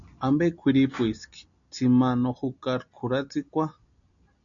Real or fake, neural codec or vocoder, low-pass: real; none; 7.2 kHz